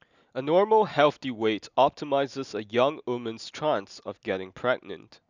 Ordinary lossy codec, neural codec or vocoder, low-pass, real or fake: none; none; 7.2 kHz; real